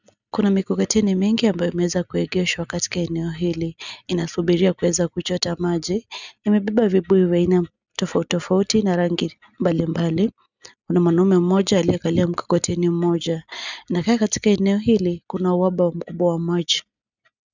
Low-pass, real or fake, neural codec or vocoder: 7.2 kHz; real; none